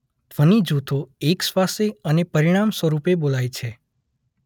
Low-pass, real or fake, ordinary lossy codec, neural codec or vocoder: 19.8 kHz; real; none; none